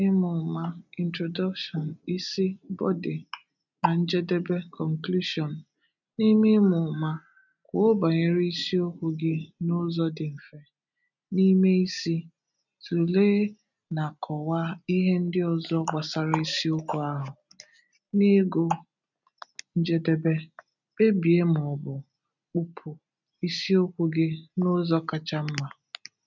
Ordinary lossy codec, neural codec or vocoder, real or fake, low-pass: none; none; real; 7.2 kHz